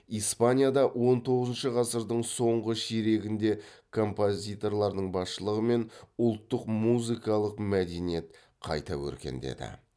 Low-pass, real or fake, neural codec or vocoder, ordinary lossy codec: none; real; none; none